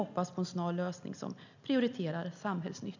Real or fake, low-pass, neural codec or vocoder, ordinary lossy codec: real; 7.2 kHz; none; none